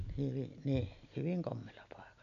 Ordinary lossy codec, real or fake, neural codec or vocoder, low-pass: none; real; none; 7.2 kHz